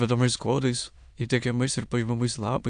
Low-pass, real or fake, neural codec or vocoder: 9.9 kHz; fake; autoencoder, 22.05 kHz, a latent of 192 numbers a frame, VITS, trained on many speakers